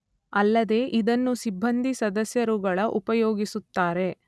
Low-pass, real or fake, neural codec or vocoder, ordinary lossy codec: none; real; none; none